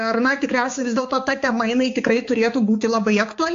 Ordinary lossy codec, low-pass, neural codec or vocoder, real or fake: AAC, 48 kbps; 7.2 kHz; codec, 16 kHz, 4 kbps, X-Codec, HuBERT features, trained on balanced general audio; fake